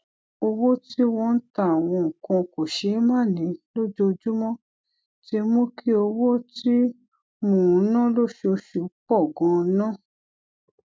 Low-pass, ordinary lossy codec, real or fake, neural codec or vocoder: none; none; real; none